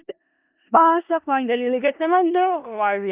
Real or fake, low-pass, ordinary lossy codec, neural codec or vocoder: fake; 3.6 kHz; Opus, 24 kbps; codec, 16 kHz in and 24 kHz out, 0.4 kbps, LongCat-Audio-Codec, four codebook decoder